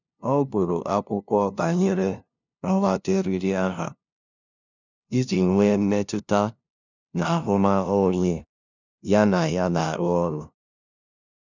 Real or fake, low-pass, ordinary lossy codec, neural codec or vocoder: fake; 7.2 kHz; none; codec, 16 kHz, 0.5 kbps, FunCodec, trained on LibriTTS, 25 frames a second